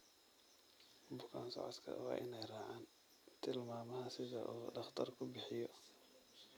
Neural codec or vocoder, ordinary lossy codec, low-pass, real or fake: none; none; none; real